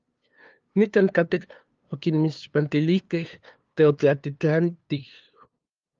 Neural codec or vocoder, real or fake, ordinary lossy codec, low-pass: codec, 16 kHz, 2 kbps, FunCodec, trained on LibriTTS, 25 frames a second; fake; Opus, 32 kbps; 7.2 kHz